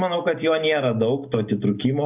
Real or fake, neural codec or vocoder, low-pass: real; none; 3.6 kHz